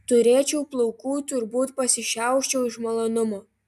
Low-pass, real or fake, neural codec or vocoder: 14.4 kHz; real; none